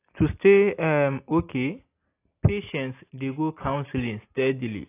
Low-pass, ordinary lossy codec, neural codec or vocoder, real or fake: 3.6 kHz; AAC, 24 kbps; none; real